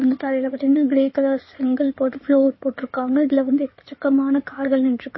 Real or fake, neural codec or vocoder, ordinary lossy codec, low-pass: fake; codec, 16 kHz in and 24 kHz out, 2.2 kbps, FireRedTTS-2 codec; MP3, 24 kbps; 7.2 kHz